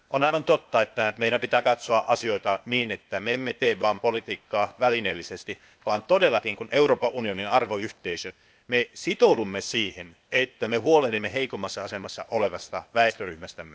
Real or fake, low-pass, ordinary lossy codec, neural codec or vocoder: fake; none; none; codec, 16 kHz, 0.8 kbps, ZipCodec